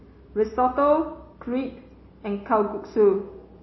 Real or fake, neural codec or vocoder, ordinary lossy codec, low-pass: real; none; MP3, 24 kbps; 7.2 kHz